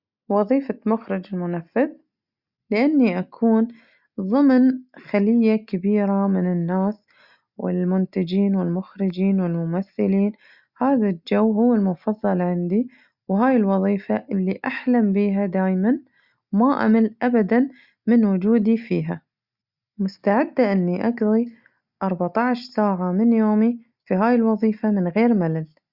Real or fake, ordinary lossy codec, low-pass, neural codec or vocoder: real; Opus, 64 kbps; 5.4 kHz; none